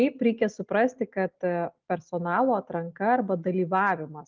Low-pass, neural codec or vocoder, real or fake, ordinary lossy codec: 7.2 kHz; none; real; Opus, 32 kbps